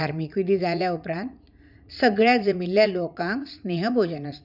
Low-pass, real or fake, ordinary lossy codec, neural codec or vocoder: 5.4 kHz; fake; none; vocoder, 44.1 kHz, 80 mel bands, Vocos